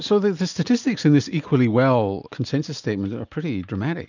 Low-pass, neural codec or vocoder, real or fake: 7.2 kHz; none; real